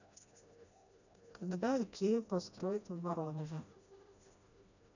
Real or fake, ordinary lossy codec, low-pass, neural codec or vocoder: fake; none; 7.2 kHz; codec, 16 kHz, 1 kbps, FreqCodec, smaller model